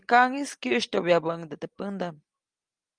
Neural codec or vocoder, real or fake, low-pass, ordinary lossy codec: none; real; 9.9 kHz; Opus, 32 kbps